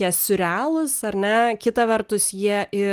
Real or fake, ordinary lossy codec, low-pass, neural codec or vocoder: real; Opus, 32 kbps; 14.4 kHz; none